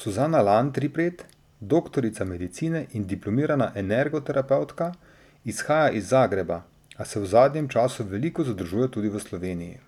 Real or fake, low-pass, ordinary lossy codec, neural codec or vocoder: real; 19.8 kHz; none; none